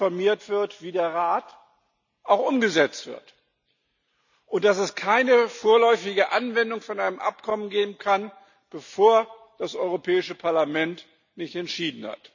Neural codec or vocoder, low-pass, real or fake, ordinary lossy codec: none; 7.2 kHz; real; none